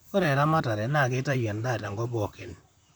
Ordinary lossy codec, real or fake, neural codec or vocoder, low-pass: none; fake; vocoder, 44.1 kHz, 128 mel bands, Pupu-Vocoder; none